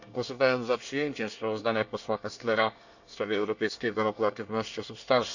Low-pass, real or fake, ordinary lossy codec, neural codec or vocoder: 7.2 kHz; fake; none; codec, 24 kHz, 1 kbps, SNAC